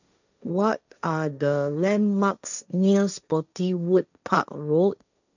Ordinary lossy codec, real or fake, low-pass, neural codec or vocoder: none; fake; 7.2 kHz; codec, 16 kHz, 1.1 kbps, Voila-Tokenizer